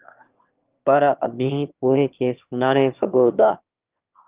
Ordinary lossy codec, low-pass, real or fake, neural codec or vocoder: Opus, 24 kbps; 3.6 kHz; fake; autoencoder, 22.05 kHz, a latent of 192 numbers a frame, VITS, trained on one speaker